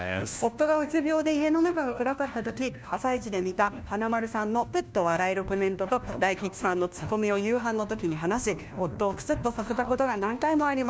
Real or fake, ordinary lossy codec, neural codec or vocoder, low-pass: fake; none; codec, 16 kHz, 1 kbps, FunCodec, trained on LibriTTS, 50 frames a second; none